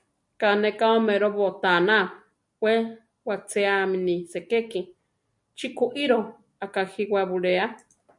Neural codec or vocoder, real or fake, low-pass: none; real; 10.8 kHz